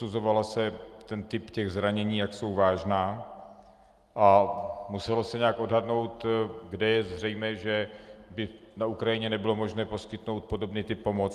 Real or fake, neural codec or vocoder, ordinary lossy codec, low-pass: real; none; Opus, 32 kbps; 14.4 kHz